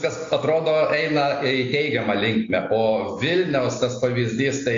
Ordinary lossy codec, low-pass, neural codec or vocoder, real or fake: AAC, 48 kbps; 7.2 kHz; none; real